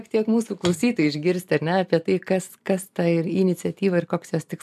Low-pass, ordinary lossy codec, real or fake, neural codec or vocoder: 14.4 kHz; MP3, 96 kbps; real; none